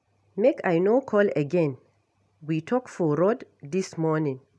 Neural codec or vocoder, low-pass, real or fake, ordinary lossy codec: none; none; real; none